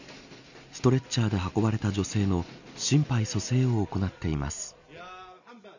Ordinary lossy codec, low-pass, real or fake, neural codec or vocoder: none; 7.2 kHz; real; none